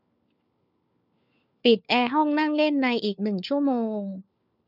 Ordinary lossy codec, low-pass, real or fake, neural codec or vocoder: none; 5.4 kHz; fake; codec, 16 kHz, 4 kbps, FunCodec, trained on LibriTTS, 50 frames a second